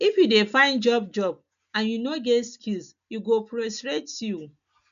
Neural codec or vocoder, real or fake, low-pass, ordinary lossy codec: none; real; 7.2 kHz; none